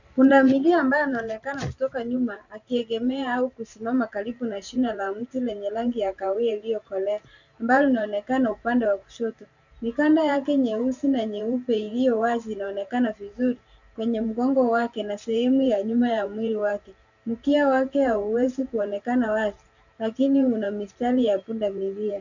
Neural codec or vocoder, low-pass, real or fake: vocoder, 44.1 kHz, 128 mel bands every 512 samples, BigVGAN v2; 7.2 kHz; fake